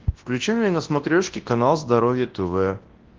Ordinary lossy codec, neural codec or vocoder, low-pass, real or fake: Opus, 16 kbps; codec, 24 kHz, 0.9 kbps, WavTokenizer, large speech release; 7.2 kHz; fake